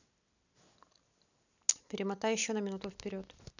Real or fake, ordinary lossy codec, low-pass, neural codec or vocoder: real; none; 7.2 kHz; none